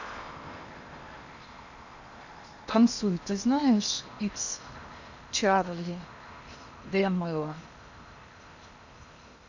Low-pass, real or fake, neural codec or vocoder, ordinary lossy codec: 7.2 kHz; fake; codec, 16 kHz in and 24 kHz out, 0.8 kbps, FocalCodec, streaming, 65536 codes; none